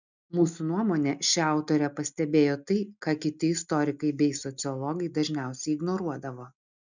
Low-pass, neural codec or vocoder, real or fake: 7.2 kHz; none; real